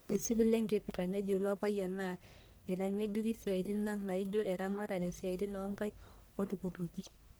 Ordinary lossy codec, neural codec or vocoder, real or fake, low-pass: none; codec, 44.1 kHz, 1.7 kbps, Pupu-Codec; fake; none